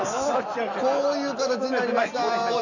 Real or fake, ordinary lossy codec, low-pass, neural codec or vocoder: real; none; 7.2 kHz; none